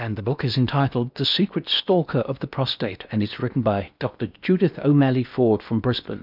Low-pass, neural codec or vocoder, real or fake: 5.4 kHz; codec, 16 kHz in and 24 kHz out, 0.8 kbps, FocalCodec, streaming, 65536 codes; fake